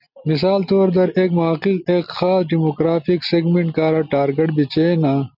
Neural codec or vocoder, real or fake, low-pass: none; real; 5.4 kHz